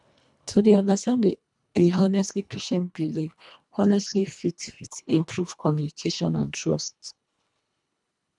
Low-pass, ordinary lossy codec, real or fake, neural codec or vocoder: 10.8 kHz; none; fake; codec, 24 kHz, 1.5 kbps, HILCodec